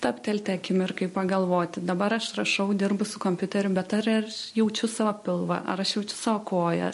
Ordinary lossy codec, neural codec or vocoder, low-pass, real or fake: MP3, 48 kbps; none; 14.4 kHz; real